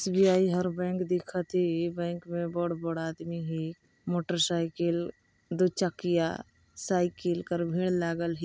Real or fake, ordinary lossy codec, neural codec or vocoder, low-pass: real; none; none; none